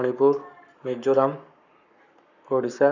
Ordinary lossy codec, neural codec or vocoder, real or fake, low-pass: none; codec, 44.1 kHz, 7.8 kbps, Pupu-Codec; fake; 7.2 kHz